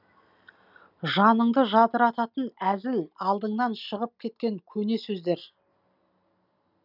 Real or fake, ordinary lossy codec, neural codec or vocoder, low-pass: real; none; none; 5.4 kHz